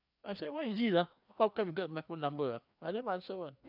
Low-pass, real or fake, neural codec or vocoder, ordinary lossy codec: 5.4 kHz; fake; codec, 16 kHz, 2 kbps, FreqCodec, larger model; none